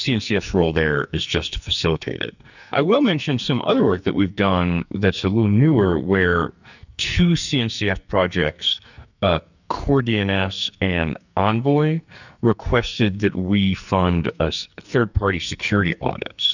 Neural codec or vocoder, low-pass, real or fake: codec, 44.1 kHz, 2.6 kbps, SNAC; 7.2 kHz; fake